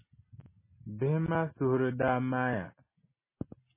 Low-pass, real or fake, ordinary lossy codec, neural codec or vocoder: 3.6 kHz; real; MP3, 16 kbps; none